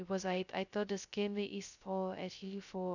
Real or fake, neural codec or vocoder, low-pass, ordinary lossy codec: fake; codec, 16 kHz, 0.2 kbps, FocalCodec; 7.2 kHz; none